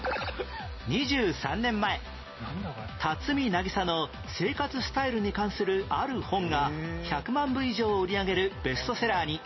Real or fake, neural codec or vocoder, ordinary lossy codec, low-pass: real; none; MP3, 24 kbps; 7.2 kHz